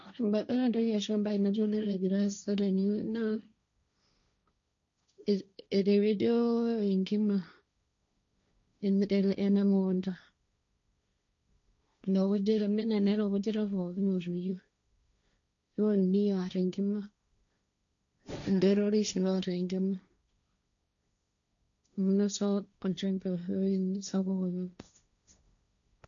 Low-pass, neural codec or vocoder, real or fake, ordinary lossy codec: 7.2 kHz; codec, 16 kHz, 1.1 kbps, Voila-Tokenizer; fake; none